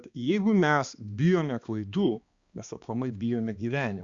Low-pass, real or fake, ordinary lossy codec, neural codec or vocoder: 7.2 kHz; fake; Opus, 64 kbps; codec, 16 kHz, 2 kbps, X-Codec, HuBERT features, trained on balanced general audio